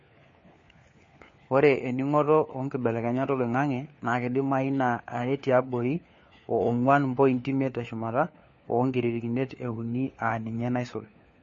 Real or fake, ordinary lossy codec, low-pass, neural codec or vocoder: fake; MP3, 32 kbps; 7.2 kHz; codec, 16 kHz, 4 kbps, FunCodec, trained on LibriTTS, 50 frames a second